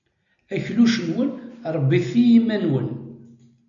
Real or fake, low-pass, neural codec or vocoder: real; 7.2 kHz; none